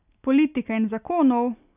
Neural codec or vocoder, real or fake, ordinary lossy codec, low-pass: none; real; none; 3.6 kHz